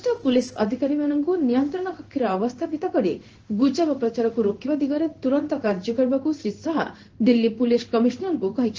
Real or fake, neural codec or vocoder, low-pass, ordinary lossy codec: fake; codec, 16 kHz in and 24 kHz out, 1 kbps, XY-Tokenizer; 7.2 kHz; Opus, 16 kbps